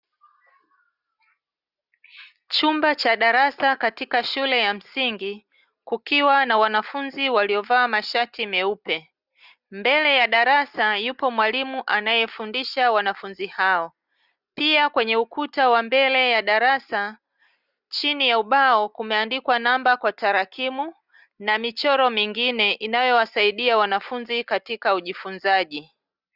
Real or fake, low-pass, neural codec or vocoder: real; 5.4 kHz; none